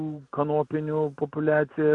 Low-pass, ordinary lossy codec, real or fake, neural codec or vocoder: 10.8 kHz; AAC, 64 kbps; real; none